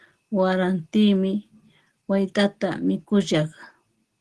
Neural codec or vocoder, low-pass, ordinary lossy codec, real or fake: none; 10.8 kHz; Opus, 16 kbps; real